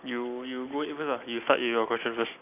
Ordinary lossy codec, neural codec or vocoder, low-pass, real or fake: none; none; 3.6 kHz; real